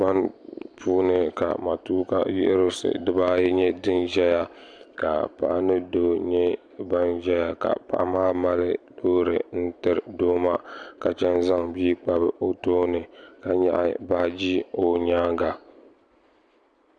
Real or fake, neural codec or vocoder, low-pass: real; none; 9.9 kHz